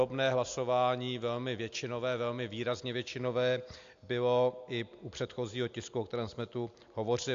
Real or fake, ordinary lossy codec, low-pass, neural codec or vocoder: real; AAC, 64 kbps; 7.2 kHz; none